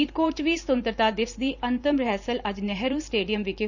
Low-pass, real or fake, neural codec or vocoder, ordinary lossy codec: 7.2 kHz; fake; vocoder, 44.1 kHz, 128 mel bands every 512 samples, BigVGAN v2; none